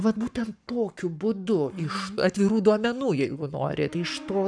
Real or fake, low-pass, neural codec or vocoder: fake; 9.9 kHz; codec, 44.1 kHz, 7.8 kbps, Pupu-Codec